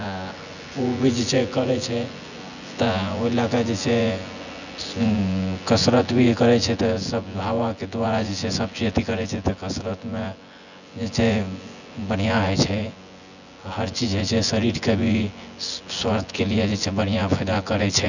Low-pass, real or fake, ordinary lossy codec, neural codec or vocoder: 7.2 kHz; fake; none; vocoder, 24 kHz, 100 mel bands, Vocos